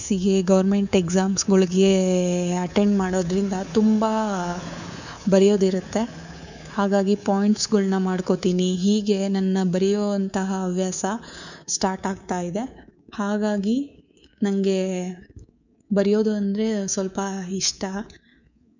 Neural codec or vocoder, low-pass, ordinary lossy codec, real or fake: codec, 24 kHz, 3.1 kbps, DualCodec; 7.2 kHz; none; fake